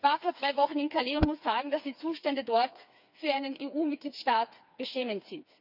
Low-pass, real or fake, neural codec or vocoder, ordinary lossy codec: 5.4 kHz; fake; codec, 16 kHz, 4 kbps, FreqCodec, smaller model; none